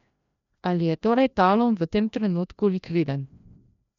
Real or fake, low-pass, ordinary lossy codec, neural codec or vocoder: fake; 7.2 kHz; none; codec, 16 kHz, 1 kbps, FreqCodec, larger model